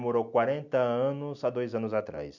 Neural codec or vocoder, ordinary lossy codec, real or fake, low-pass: none; none; real; 7.2 kHz